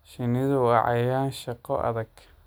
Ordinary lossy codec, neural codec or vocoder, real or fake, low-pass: none; none; real; none